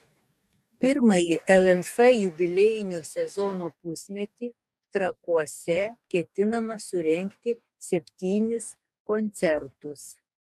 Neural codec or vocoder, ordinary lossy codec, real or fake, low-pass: codec, 44.1 kHz, 2.6 kbps, DAC; MP3, 96 kbps; fake; 14.4 kHz